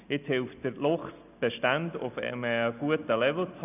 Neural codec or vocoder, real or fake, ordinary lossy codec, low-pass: none; real; none; 3.6 kHz